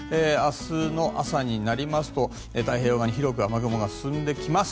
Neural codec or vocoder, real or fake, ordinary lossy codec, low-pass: none; real; none; none